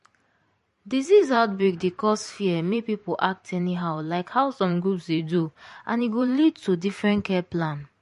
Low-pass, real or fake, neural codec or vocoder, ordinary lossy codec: 14.4 kHz; fake; vocoder, 44.1 kHz, 128 mel bands every 512 samples, BigVGAN v2; MP3, 48 kbps